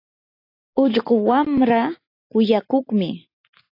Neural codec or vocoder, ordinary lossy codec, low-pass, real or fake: none; AAC, 32 kbps; 5.4 kHz; real